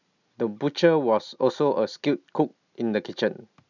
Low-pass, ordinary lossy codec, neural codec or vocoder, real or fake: 7.2 kHz; none; none; real